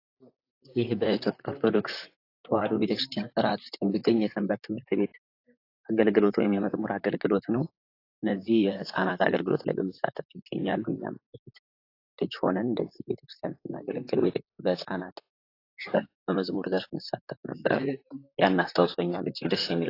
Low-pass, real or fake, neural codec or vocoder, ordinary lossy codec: 5.4 kHz; fake; vocoder, 44.1 kHz, 128 mel bands, Pupu-Vocoder; AAC, 32 kbps